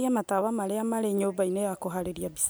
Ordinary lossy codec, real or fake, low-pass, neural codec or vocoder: none; real; none; none